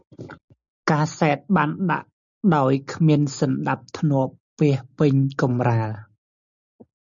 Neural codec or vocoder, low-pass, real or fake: none; 7.2 kHz; real